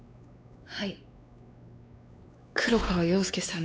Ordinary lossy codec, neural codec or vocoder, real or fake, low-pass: none; codec, 16 kHz, 4 kbps, X-Codec, WavLM features, trained on Multilingual LibriSpeech; fake; none